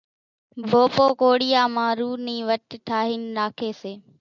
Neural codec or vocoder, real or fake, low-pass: none; real; 7.2 kHz